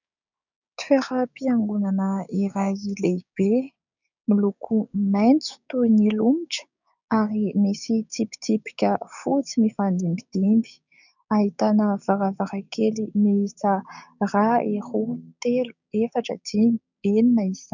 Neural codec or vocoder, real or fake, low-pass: codec, 16 kHz, 6 kbps, DAC; fake; 7.2 kHz